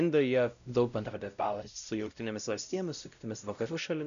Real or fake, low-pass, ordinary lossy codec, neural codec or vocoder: fake; 7.2 kHz; MP3, 96 kbps; codec, 16 kHz, 0.5 kbps, X-Codec, WavLM features, trained on Multilingual LibriSpeech